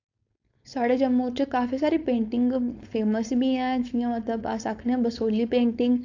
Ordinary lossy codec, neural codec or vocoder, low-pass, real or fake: AAC, 48 kbps; codec, 16 kHz, 4.8 kbps, FACodec; 7.2 kHz; fake